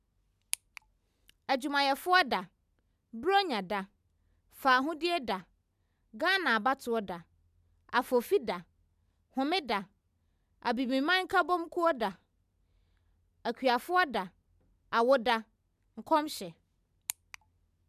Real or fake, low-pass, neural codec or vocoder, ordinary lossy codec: real; 14.4 kHz; none; none